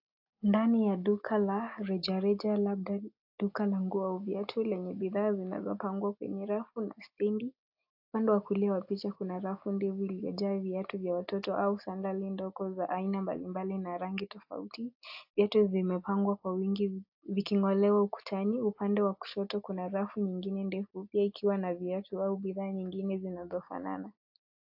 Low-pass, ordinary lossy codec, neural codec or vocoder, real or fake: 5.4 kHz; AAC, 48 kbps; none; real